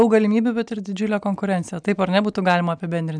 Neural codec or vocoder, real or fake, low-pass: none; real; 9.9 kHz